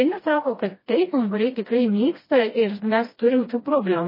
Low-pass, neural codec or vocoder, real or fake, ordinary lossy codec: 5.4 kHz; codec, 16 kHz, 1 kbps, FreqCodec, smaller model; fake; MP3, 24 kbps